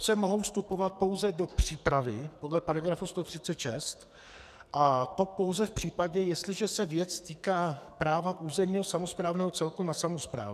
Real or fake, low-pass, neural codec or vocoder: fake; 14.4 kHz; codec, 44.1 kHz, 2.6 kbps, SNAC